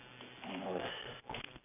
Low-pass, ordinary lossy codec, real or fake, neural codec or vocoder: 3.6 kHz; none; real; none